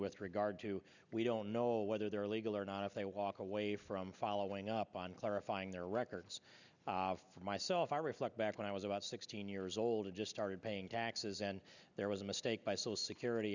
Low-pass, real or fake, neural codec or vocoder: 7.2 kHz; real; none